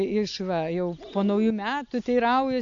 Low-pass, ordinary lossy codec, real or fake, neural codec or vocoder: 7.2 kHz; AAC, 64 kbps; real; none